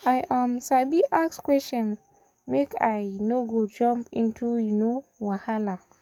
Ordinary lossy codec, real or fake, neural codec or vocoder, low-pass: none; fake; codec, 44.1 kHz, 7.8 kbps, DAC; 19.8 kHz